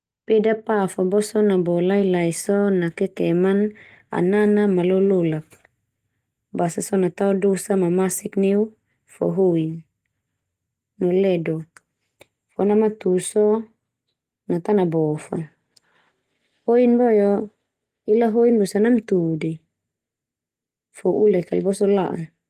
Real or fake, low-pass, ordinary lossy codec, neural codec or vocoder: real; 14.4 kHz; Opus, 24 kbps; none